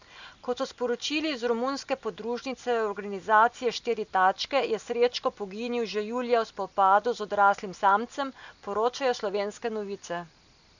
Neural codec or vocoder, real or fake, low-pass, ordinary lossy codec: none; real; 7.2 kHz; none